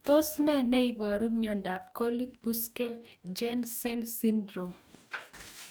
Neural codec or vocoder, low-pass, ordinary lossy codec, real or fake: codec, 44.1 kHz, 2.6 kbps, DAC; none; none; fake